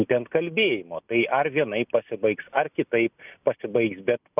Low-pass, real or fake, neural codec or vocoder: 3.6 kHz; real; none